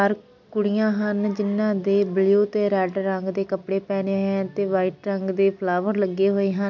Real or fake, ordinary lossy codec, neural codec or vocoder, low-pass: real; MP3, 64 kbps; none; 7.2 kHz